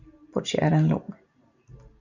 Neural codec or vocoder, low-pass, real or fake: none; 7.2 kHz; real